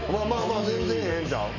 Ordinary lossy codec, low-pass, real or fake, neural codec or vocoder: none; 7.2 kHz; fake; autoencoder, 48 kHz, 128 numbers a frame, DAC-VAE, trained on Japanese speech